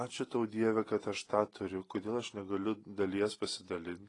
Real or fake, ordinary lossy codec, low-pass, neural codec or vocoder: real; AAC, 32 kbps; 10.8 kHz; none